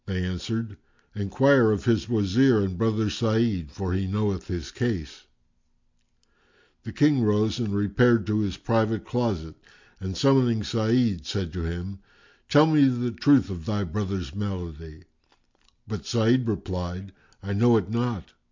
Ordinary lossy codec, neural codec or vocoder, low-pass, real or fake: MP3, 48 kbps; none; 7.2 kHz; real